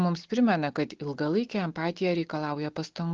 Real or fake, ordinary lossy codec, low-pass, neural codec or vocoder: real; Opus, 16 kbps; 7.2 kHz; none